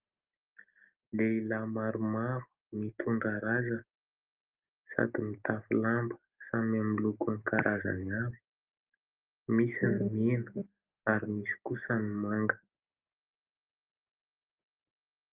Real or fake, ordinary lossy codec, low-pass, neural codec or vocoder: real; Opus, 24 kbps; 3.6 kHz; none